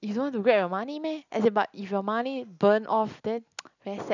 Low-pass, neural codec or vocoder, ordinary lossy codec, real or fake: 7.2 kHz; none; none; real